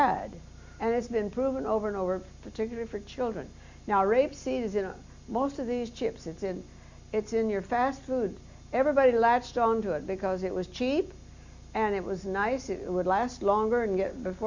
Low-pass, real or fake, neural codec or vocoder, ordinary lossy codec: 7.2 kHz; real; none; Opus, 64 kbps